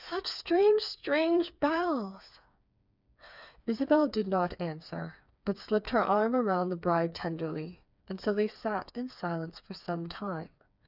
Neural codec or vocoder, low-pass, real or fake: codec, 16 kHz, 4 kbps, FreqCodec, smaller model; 5.4 kHz; fake